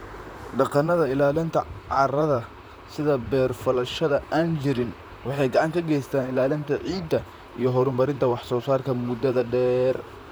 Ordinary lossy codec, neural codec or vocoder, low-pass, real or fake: none; vocoder, 44.1 kHz, 128 mel bands, Pupu-Vocoder; none; fake